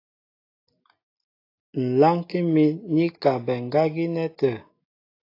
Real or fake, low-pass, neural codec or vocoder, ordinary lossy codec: real; 5.4 kHz; none; AAC, 32 kbps